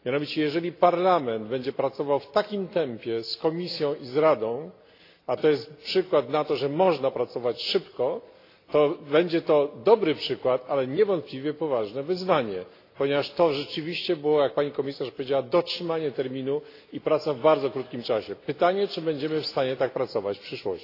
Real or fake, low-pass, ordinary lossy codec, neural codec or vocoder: real; 5.4 kHz; AAC, 32 kbps; none